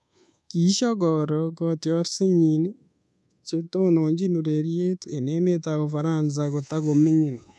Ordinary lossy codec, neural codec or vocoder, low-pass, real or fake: none; codec, 24 kHz, 1.2 kbps, DualCodec; none; fake